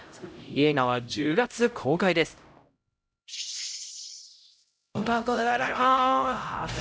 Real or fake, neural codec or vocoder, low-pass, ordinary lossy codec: fake; codec, 16 kHz, 0.5 kbps, X-Codec, HuBERT features, trained on LibriSpeech; none; none